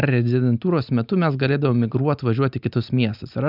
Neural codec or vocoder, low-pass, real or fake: none; 5.4 kHz; real